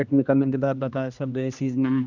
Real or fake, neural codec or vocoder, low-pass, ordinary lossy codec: fake; codec, 16 kHz, 1 kbps, X-Codec, HuBERT features, trained on general audio; 7.2 kHz; none